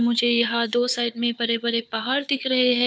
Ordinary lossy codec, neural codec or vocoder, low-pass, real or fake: none; codec, 16 kHz, 4 kbps, FunCodec, trained on Chinese and English, 50 frames a second; none; fake